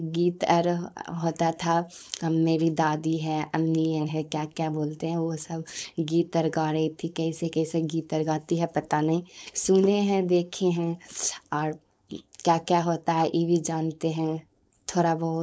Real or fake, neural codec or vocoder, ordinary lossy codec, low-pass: fake; codec, 16 kHz, 4.8 kbps, FACodec; none; none